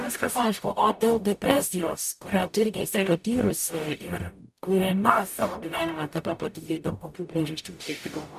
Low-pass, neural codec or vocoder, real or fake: 14.4 kHz; codec, 44.1 kHz, 0.9 kbps, DAC; fake